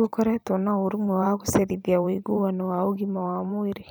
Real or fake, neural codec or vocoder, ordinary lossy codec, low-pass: fake; vocoder, 44.1 kHz, 128 mel bands every 512 samples, BigVGAN v2; none; none